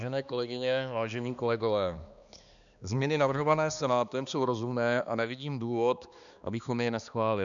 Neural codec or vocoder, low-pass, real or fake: codec, 16 kHz, 2 kbps, X-Codec, HuBERT features, trained on balanced general audio; 7.2 kHz; fake